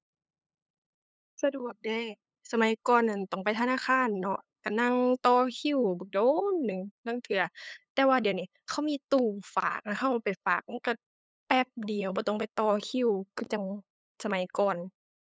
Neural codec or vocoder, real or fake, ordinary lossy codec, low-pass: codec, 16 kHz, 8 kbps, FunCodec, trained on LibriTTS, 25 frames a second; fake; none; none